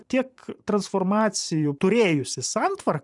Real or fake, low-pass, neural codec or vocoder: fake; 10.8 kHz; vocoder, 44.1 kHz, 128 mel bands every 512 samples, BigVGAN v2